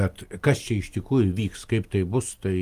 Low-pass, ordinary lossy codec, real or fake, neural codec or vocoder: 14.4 kHz; Opus, 32 kbps; real; none